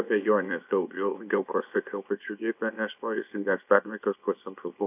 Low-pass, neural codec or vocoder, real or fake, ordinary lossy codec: 3.6 kHz; codec, 24 kHz, 0.9 kbps, WavTokenizer, small release; fake; AAC, 32 kbps